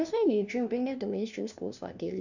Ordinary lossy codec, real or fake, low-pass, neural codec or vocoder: none; fake; 7.2 kHz; codec, 16 kHz, 1 kbps, FunCodec, trained on LibriTTS, 50 frames a second